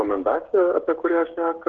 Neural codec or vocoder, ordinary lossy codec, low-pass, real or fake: codec, 16 kHz, 16 kbps, FreqCodec, smaller model; Opus, 16 kbps; 7.2 kHz; fake